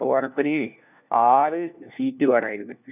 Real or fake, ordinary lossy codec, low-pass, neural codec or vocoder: fake; none; 3.6 kHz; codec, 16 kHz, 1 kbps, FunCodec, trained on LibriTTS, 50 frames a second